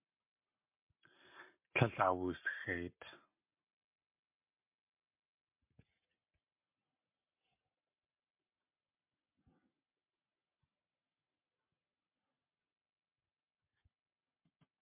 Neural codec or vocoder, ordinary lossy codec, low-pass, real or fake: none; MP3, 32 kbps; 3.6 kHz; real